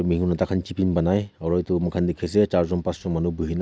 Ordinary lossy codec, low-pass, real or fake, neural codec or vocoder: none; none; real; none